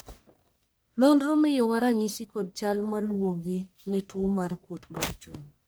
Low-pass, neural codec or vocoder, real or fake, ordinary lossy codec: none; codec, 44.1 kHz, 1.7 kbps, Pupu-Codec; fake; none